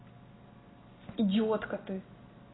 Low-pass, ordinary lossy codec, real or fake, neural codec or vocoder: 7.2 kHz; AAC, 16 kbps; real; none